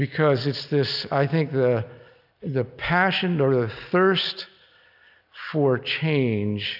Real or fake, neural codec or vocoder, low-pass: real; none; 5.4 kHz